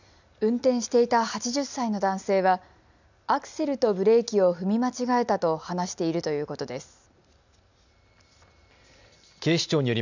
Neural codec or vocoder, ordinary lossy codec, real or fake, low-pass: none; none; real; 7.2 kHz